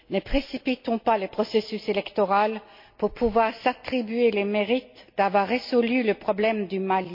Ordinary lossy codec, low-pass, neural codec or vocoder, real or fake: MP3, 32 kbps; 5.4 kHz; none; real